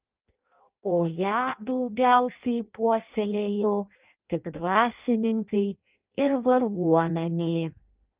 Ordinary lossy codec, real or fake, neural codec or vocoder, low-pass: Opus, 24 kbps; fake; codec, 16 kHz in and 24 kHz out, 0.6 kbps, FireRedTTS-2 codec; 3.6 kHz